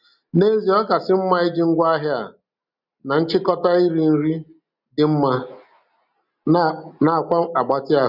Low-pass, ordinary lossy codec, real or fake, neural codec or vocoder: 5.4 kHz; none; real; none